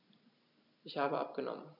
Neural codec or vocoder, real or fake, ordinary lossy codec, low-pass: none; real; none; 5.4 kHz